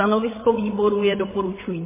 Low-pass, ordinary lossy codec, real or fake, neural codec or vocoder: 3.6 kHz; MP3, 16 kbps; fake; codec, 16 kHz, 16 kbps, FreqCodec, larger model